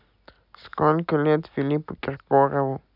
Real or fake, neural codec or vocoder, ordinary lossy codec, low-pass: real; none; none; 5.4 kHz